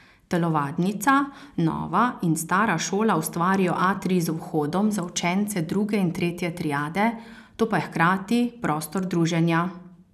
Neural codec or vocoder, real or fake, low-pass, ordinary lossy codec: none; real; 14.4 kHz; none